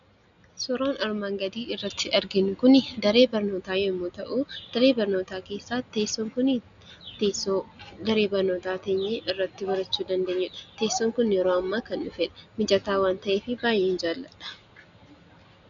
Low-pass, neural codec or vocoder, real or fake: 7.2 kHz; none; real